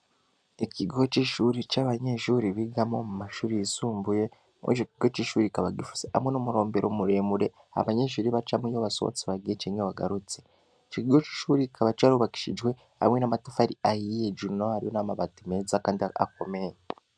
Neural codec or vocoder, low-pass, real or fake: none; 9.9 kHz; real